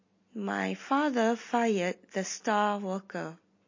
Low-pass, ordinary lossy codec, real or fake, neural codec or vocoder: 7.2 kHz; MP3, 32 kbps; real; none